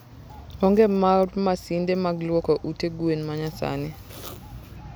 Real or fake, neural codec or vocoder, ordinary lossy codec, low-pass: real; none; none; none